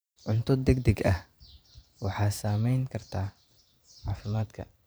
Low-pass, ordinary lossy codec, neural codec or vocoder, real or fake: none; none; vocoder, 44.1 kHz, 128 mel bands every 512 samples, BigVGAN v2; fake